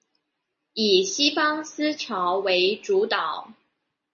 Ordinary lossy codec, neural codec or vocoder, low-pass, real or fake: MP3, 32 kbps; none; 7.2 kHz; real